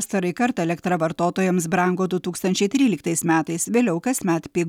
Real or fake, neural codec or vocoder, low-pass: real; none; 19.8 kHz